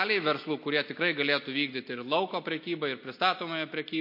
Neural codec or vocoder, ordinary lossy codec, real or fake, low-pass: none; MP3, 32 kbps; real; 5.4 kHz